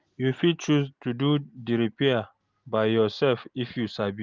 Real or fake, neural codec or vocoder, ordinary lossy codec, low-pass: real; none; Opus, 32 kbps; 7.2 kHz